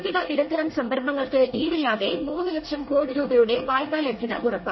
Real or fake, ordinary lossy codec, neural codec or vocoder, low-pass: fake; MP3, 24 kbps; codec, 24 kHz, 1 kbps, SNAC; 7.2 kHz